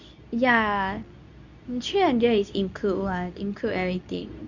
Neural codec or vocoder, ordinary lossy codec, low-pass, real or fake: codec, 24 kHz, 0.9 kbps, WavTokenizer, medium speech release version 2; none; 7.2 kHz; fake